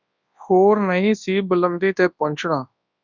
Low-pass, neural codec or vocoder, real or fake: 7.2 kHz; codec, 24 kHz, 0.9 kbps, WavTokenizer, large speech release; fake